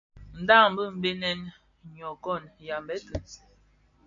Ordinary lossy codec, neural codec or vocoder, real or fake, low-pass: AAC, 64 kbps; none; real; 7.2 kHz